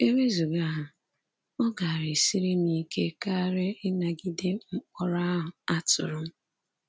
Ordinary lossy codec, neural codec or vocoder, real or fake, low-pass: none; none; real; none